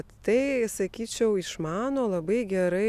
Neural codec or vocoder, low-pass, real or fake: none; 14.4 kHz; real